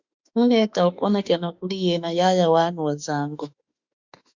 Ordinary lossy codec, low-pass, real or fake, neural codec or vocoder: Opus, 64 kbps; 7.2 kHz; fake; autoencoder, 48 kHz, 32 numbers a frame, DAC-VAE, trained on Japanese speech